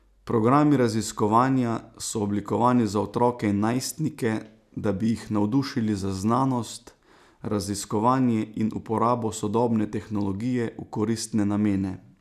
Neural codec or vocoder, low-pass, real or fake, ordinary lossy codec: none; 14.4 kHz; real; none